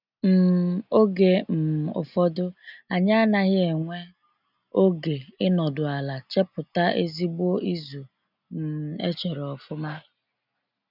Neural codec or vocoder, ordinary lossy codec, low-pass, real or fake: none; none; 5.4 kHz; real